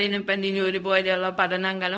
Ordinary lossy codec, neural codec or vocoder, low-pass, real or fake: none; codec, 16 kHz, 0.4 kbps, LongCat-Audio-Codec; none; fake